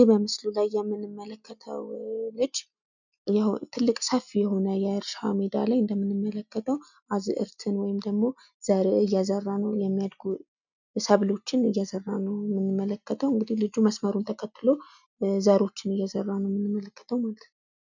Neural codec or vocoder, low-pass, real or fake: none; 7.2 kHz; real